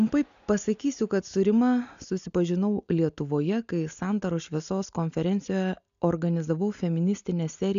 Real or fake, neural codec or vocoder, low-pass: real; none; 7.2 kHz